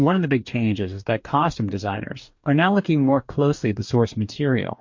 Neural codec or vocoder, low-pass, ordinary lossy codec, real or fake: codec, 44.1 kHz, 2.6 kbps, DAC; 7.2 kHz; MP3, 48 kbps; fake